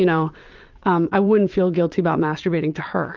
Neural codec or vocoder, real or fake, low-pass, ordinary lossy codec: vocoder, 22.05 kHz, 80 mel bands, WaveNeXt; fake; 7.2 kHz; Opus, 24 kbps